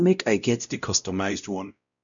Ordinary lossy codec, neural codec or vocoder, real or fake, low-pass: none; codec, 16 kHz, 0.5 kbps, X-Codec, WavLM features, trained on Multilingual LibriSpeech; fake; 7.2 kHz